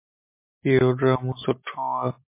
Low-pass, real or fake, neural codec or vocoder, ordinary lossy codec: 3.6 kHz; real; none; MP3, 24 kbps